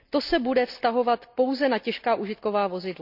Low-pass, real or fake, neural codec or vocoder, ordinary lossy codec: 5.4 kHz; real; none; none